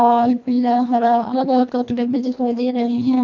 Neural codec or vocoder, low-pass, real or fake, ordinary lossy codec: codec, 24 kHz, 1.5 kbps, HILCodec; 7.2 kHz; fake; none